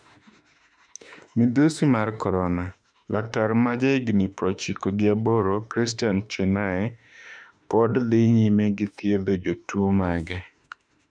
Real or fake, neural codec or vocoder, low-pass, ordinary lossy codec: fake; autoencoder, 48 kHz, 32 numbers a frame, DAC-VAE, trained on Japanese speech; 9.9 kHz; none